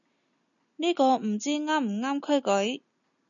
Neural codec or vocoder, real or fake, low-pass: none; real; 7.2 kHz